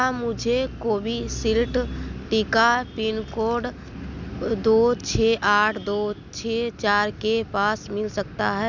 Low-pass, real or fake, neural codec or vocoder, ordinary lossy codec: 7.2 kHz; real; none; none